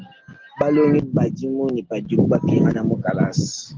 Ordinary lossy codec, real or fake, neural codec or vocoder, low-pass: Opus, 16 kbps; real; none; 7.2 kHz